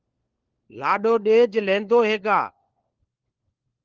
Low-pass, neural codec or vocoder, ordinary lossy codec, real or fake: 7.2 kHz; codec, 16 kHz, 4 kbps, FunCodec, trained on LibriTTS, 50 frames a second; Opus, 16 kbps; fake